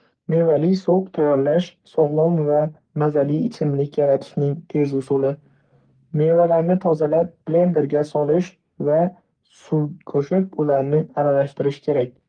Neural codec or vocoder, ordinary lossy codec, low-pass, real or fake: codec, 44.1 kHz, 3.4 kbps, Pupu-Codec; Opus, 24 kbps; 9.9 kHz; fake